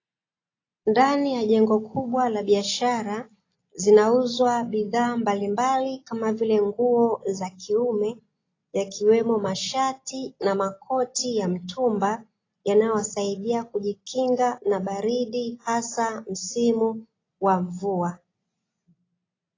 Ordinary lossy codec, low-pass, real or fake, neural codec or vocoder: AAC, 32 kbps; 7.2 kHz; real; none